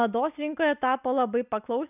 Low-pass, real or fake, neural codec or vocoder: 3.6 kHz; real; none